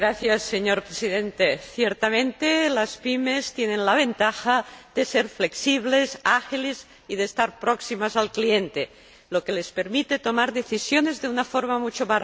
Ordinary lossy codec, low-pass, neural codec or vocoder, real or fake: none; none; none; real